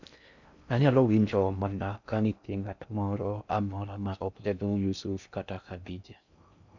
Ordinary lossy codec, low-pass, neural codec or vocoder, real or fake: none; 7.2 kHz; codec, 16 kHz in and 24 kHz out, 0.6 kbps, FocalCodec, streaming, 4096 codes; fake